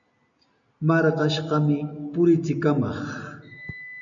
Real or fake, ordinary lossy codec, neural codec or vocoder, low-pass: real; MP3, 96 kbps; none; 7.2 kHz